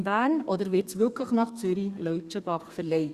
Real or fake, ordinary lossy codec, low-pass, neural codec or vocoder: fake; Opus, 64 kbps; 14.4 kHz; codec, 32 kHz, 1.9 kbps, SNAC